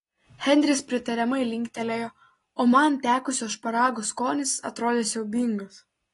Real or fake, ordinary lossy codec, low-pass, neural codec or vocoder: real; AAC, 32 kbps; 10.8 kHz; none